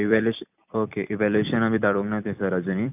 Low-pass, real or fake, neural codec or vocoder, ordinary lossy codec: 3.6 kHz; fake; vocoder, 44.1 kHz, 128 mel bands every 256 samples, BigVGAN v2; none